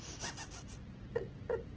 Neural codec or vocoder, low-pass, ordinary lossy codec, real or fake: none; 7.2 kHz; Opus, 16 kbps; real